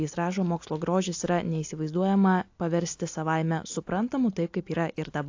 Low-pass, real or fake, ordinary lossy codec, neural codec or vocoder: 7.2 kHz; real; AAC, 48 kbps; none